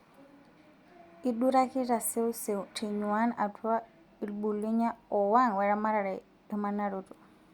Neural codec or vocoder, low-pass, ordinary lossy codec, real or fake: none; 19.8 kHz; Opus, 64 kbps; real